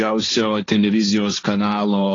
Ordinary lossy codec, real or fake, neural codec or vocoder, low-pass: AAC, 32 kbps; fake; codec, 16 kHz, 1.1 kbps, Voila-Tokenizer; 7.2 kHz